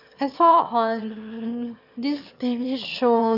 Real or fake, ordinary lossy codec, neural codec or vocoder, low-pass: fake; none; autoencoder, 22.05 kHz, a latent of 192 numbers a frame, VITS, trained on one speaker; 5.4 kHz